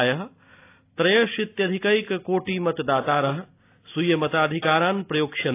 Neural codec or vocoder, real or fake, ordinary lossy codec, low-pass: none; real; AAC, 24 kbps; 3.6 kHz